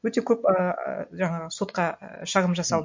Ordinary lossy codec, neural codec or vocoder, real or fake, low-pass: MP3, 48 kbps; none; real; 7.2 kHz